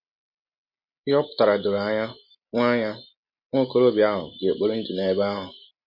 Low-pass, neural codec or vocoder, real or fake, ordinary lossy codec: 5.4 kHz; none; real; MP3, 32 kbps